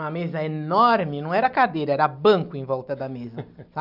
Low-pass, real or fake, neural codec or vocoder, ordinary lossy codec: 5.4 kHz; real; none; none